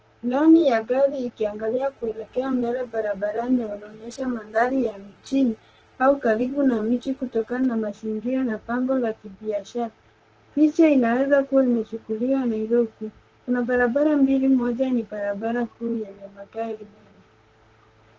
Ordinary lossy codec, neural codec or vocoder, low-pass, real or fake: Opus, 16 kbps; vocoder, 44.1 kHz, 128 mel bands, Pupu-Vocoder; 7.2 kHz; fake